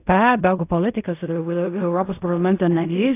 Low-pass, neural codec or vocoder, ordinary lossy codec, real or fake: 3.6 kHz; codec, 16 kHz in and 24 kHz out, 0.4 kbps, LongCat-Audio-Codec, fine tuned four codebook decoder; AAC, 24 kbps; fake